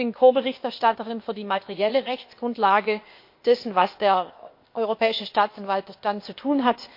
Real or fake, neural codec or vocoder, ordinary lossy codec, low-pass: fake; codec, 16 kHz, 0.8 kbps, ZipCodec; MP3, 32 kbps; 5.4 kHz